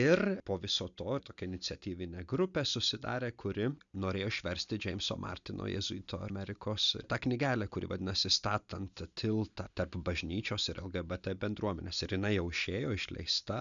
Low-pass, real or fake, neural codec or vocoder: 7.2 kHz; real; none